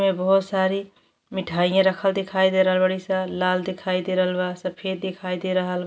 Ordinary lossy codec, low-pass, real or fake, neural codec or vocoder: none; none; real; none